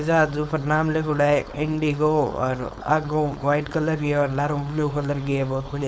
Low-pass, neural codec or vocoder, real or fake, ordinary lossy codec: none; codec, 16 kHz, 4.8 kbps, FACodec; fake; none